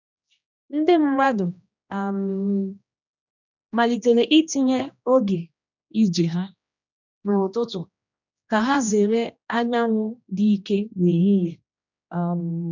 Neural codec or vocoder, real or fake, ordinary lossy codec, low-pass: codec, 16 kHz, 1 kbps, X-Codec, HuBERT features, trained on general audio; fake; none; 7.2 kHz